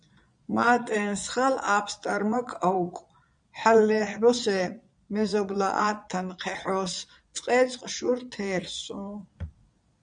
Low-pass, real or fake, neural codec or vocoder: 9.9 kHz; fake; vocoder, 22.05 kHz, 80 mel bands, Vocos